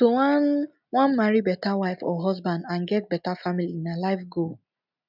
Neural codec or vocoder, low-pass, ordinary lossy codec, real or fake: none; 5.4 kHz; none; real